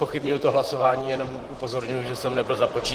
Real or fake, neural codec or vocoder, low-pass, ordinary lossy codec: fake; vocoder, 44.1 kHz, 128 mel bands, Pupu-Vocoder; 14.4 kHz; Opus, 16 kbps